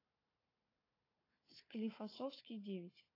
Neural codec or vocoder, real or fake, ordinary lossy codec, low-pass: codec, 16 kHz, 4 kbps, FunCodec, trained on Chinese and English, 50 frames a second; fake; AAC, 24 kbps; 5.4 kHz